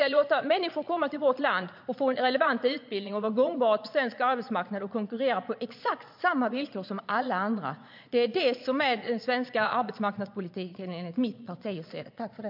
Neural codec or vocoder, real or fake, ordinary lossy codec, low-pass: vocoder, 22.05 kHz, 80 mel bands, Vocos; fake; MP3, 48 kbps; 5.4 kHz